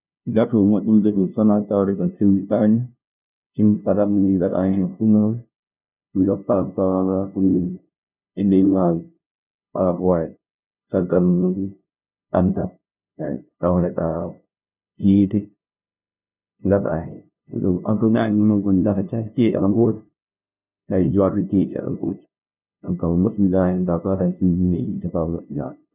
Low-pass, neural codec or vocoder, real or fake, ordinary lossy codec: 3.6 kHz; codec, 16 kHz, 0.5 kbps, FunCodec, trained on LibriTTS, 25 frames a second; fake; none